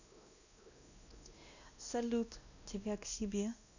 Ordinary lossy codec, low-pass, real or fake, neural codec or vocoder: none; 7.2 kHz; fake; codec, 16 kHz, 1 kbps, X-Codec, WavLM features, trained on Multilingual LibriSpeech